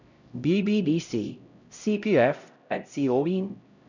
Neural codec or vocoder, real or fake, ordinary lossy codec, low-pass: codec, 16 kHz, 0.5 kbps, X-Codec, HuBERT features, trained on LibriSpeech; fake; none; 7.2 kHz